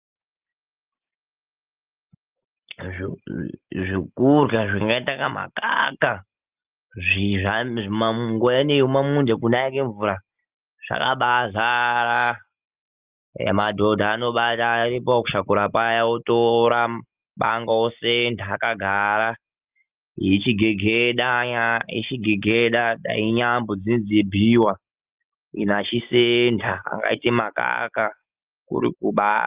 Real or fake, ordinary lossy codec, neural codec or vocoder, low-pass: real; Opus, 24 kbps; none; 3.6 kHz